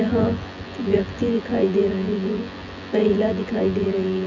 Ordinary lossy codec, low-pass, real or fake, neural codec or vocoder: none; 7.2 kHz; fake; vocoder, 24 kHz, 100 mel bands, Vocos